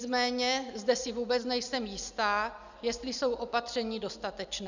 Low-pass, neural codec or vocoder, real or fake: 7.2 kHz; none; real